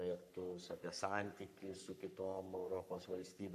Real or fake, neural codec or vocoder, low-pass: fake; codec, 44.1 kHz, 3.4 kbps, Pupu-Codec; 14.4 kHz